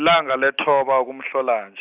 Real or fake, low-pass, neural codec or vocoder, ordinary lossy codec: real; 3.6 kHz; none; Opus, 64 kbps